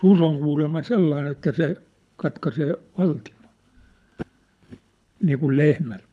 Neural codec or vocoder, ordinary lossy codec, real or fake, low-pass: codec, 24 kHz, 6 kbps, HILCodec; none; fake; none